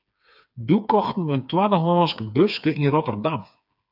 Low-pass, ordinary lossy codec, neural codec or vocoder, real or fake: 5.4 kHz; AAC, 48 kbps; codec, 16 kHz, 4 kbps, FreqCodec, smaller model; fake